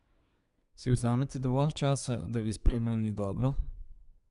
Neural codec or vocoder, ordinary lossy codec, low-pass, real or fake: codec, 24 kHz, 1 kbps, SNAC; none; 10.8 kHz; fake